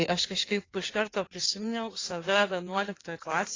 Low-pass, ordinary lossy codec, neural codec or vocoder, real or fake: 7.2 kHz; AAC, 32 kbps; codec, 16 kHz in and 24 kHz out, 1.1 kbps, FireRedTTS-2 codec; fake